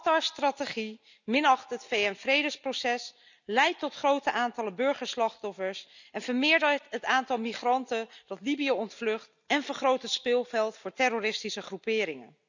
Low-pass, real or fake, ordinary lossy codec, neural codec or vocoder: 7.2 kHz; real; none; none